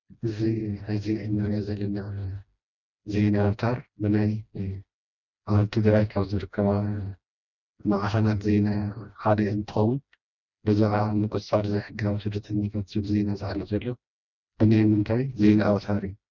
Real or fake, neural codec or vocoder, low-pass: fake; codec, 16 kHz, 1 kbps, FreqCodec, smaller model; 7.2 kHz